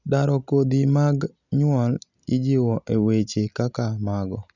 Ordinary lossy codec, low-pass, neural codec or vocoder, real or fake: none; 7.2 kHz; none; real